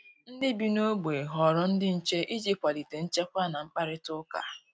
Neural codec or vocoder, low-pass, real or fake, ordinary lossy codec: none; none; real; none